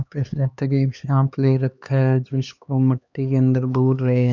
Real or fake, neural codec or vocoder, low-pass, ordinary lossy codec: fake; codec, 16 kHz, 2 kbps, X-Codec, HuBERT features, trained on LibriSpeech; 7.2 kHz; none